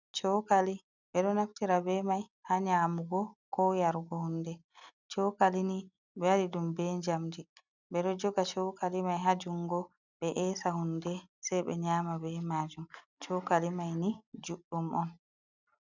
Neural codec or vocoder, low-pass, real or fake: none; 7.2 kHz; real